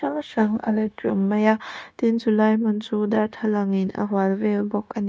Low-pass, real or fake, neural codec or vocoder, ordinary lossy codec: none; fake; codec, 16 kHz, 0.9 kbps, LongCat-Audio-Codec; none